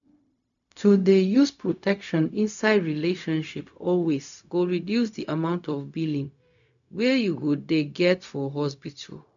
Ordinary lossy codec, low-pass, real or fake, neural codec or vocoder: none; 7.2 kHz; fake; codec, 16 kHz, 0.4 kbps, LongCat-Audio-Codec